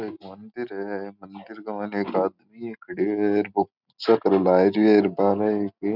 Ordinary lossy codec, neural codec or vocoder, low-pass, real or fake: none; none; 5.4 kHz; real